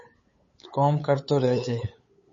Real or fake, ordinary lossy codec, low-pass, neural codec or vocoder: fake; MP3, 32 kbps; 7.2 kHz; codec, 16 kHz, 8 kbps, FunCodec, trained on LibriTTS, 25 frames a second